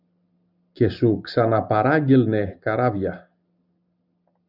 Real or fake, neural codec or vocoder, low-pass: real; none; 5.4 kHz